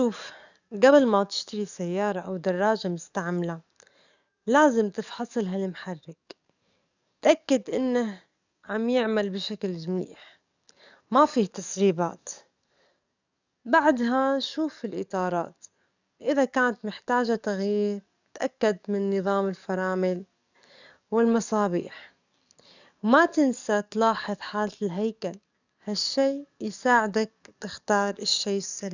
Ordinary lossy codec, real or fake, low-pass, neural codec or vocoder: none; fake; 7.2 kHz; codec, 44.1 kHz, 7.8 kbps, DAC